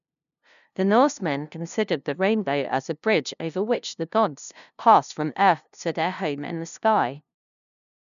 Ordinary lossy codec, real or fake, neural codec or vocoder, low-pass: none; fake; codec, 16 kHz, 0.5 kbps, FunCodec, trained on LibriTTS, 25 frames a second; 7.2 kHz